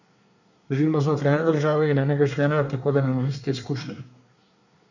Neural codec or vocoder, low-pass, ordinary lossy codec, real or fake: codec, 24 kHz, 1 kbps, SNAC; 7.2 kHz; none; fake